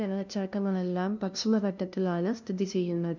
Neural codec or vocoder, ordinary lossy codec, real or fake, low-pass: codec, 16 kHz, 0.5 kbps, FunCodec, trained on LibriTTS, 25 frames a second; none; fake; 7.2 kHz